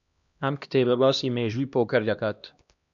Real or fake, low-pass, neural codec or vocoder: fake; 7.2 kHz; codec, 16 kHz, 1 kbps, X-Codec, HuBERT features, trained on LibriSpeech